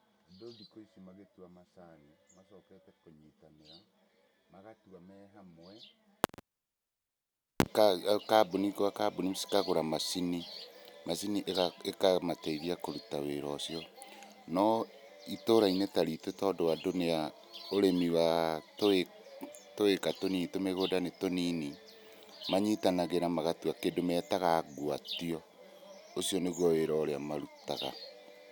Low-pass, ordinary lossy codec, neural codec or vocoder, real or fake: none; none; none; real